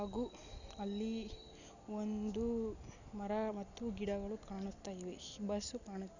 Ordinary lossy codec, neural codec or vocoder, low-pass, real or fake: none; none; 7.2 kHz; real